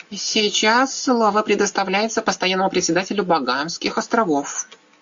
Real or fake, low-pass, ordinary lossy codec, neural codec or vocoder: real; 7.2 kHz; AAC, 64 kbps; none